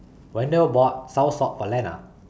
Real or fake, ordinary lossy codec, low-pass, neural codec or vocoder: real; none; none; none